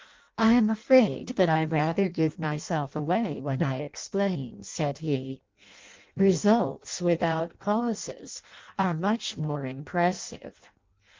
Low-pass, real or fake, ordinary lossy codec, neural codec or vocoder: 7.2 kHz; fake; Opus, 24 kbps; codec, 16 kHz in and 24 kHz out, 0.6 kbps, FireRedTTS-2 codec